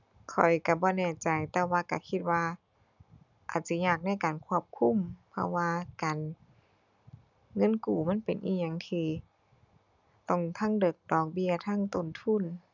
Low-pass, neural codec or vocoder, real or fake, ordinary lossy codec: 7.2 kHz; none; real; none